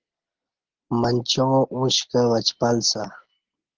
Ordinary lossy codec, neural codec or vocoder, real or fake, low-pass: Opus, 16 kbps; none; real; 7.2 kHz